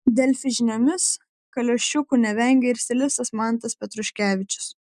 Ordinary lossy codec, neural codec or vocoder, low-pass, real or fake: MP3, 96 kbps; none; 14.4 kHz; real